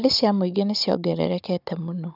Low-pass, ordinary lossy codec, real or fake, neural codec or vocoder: 5.4 kHz; none; fake; codec, 16 kHz, 16 kbps, FunCodec, trained on Chinese and English, 50 frames a second